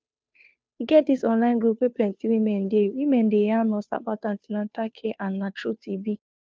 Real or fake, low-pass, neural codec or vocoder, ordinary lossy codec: fake; none; codec, 16 kHz, 2 kbps, FunCodec, trained on Chinese and English, 25 frames a second; none